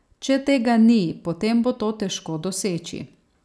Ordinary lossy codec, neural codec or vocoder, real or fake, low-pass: none; none; real; none